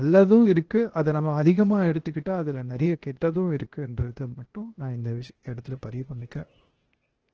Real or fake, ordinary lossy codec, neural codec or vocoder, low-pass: fake; Opus, 16 kbps; codec, 16 kHz, 0.8 kbps, ZipCodec; 7.2 kHz